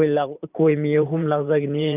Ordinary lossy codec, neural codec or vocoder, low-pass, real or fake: none; vocoder, 44.1 kHz, 128 mel bands every 512 samples, BigVGAN v2; 3.6 kHz; fake